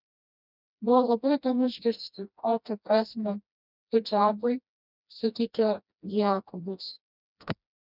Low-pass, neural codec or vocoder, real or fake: 5.4 kHz; codec, 16 kHz, 1 kbps, FreqCodec, smaller model; fake